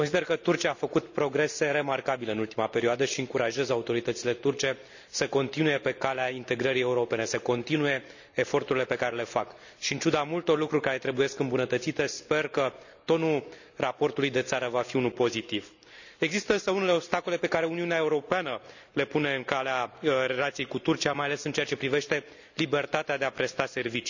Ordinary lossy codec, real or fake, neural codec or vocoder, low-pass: none; real; none; 7.2 kHz